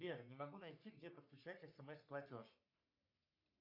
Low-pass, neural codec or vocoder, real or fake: 5.4 kHz; codec, 44.1 kHz, 3.4 kbps, Pupu-Codec; fake